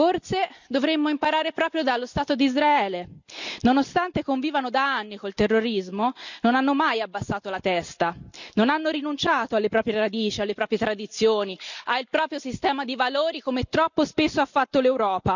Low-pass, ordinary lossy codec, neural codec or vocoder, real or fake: 7.2 kHz; none; none; real